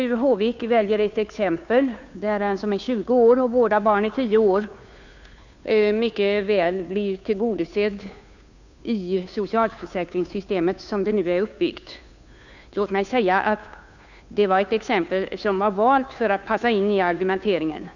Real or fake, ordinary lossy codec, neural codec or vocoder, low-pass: fake; none; codec, 16 kHz, 2 kbps, FunCodec, trained on Chinese and English, 25 frames a second; 7.2 kHz